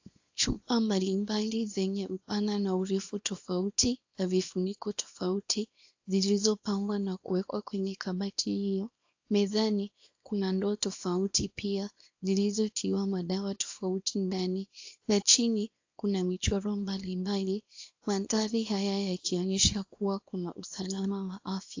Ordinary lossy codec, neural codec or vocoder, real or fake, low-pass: AAC, 48 kbps; codec, 24 kHz, 0.9 kbps, WavTokenizer, small release; fake; 7.2 kHz